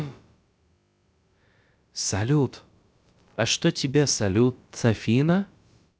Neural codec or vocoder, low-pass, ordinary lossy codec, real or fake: codec, 16 kHz, about 1 kbps, DyCAST, with the encoder's durations; none; none; fake